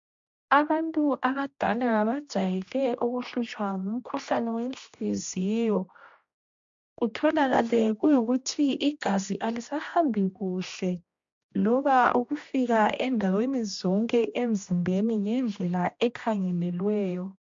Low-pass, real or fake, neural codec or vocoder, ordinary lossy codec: 7.2 kHz; fake; codec, 16 kHz, 1 kbps, X-Codec, HuBERT features, trained on general audio; MP3, 48 kbps